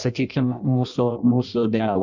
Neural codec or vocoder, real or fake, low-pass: codec, 16 kHz in and 24 kHz out, 0.6 kbps, FireRedTTS-2 codec; fake; 7.2 kHz